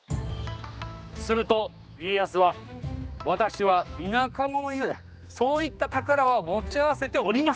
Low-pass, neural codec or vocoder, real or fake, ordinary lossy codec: none; codec, 16 kHz, 2 kbps, X-Codec, HuBERT features, trained on general audio; fake; none